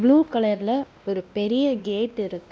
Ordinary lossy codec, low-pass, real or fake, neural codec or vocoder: none; none; fake; codec, 16 kHz, 1 kbps, X-Codec, WavLM features, trained on Multilingual LibriSpeech